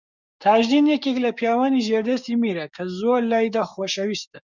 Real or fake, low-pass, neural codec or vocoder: fake; 7.2 kHz; codec, 16 kHz, 6 kbps, DAC